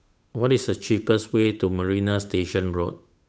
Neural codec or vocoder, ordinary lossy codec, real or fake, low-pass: codec, 16 kHz, 8 kbps, FunCodec, trained on Chinese and English, 25 frames a second; none; fake; none